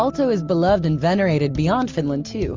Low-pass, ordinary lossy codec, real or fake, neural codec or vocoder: 7.2 kHz; Opus, 32 kbps; real; none